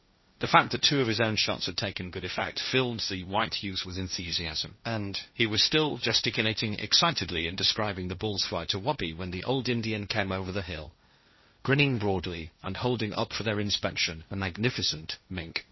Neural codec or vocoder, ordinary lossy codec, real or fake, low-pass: codec, 16 kHz, 1.1 kbps, Voila-Tokenizer; MP3, 24 kbps; fake; 7.2 kHz